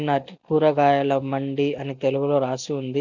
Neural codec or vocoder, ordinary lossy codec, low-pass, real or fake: none; none; 7.2 kHz; real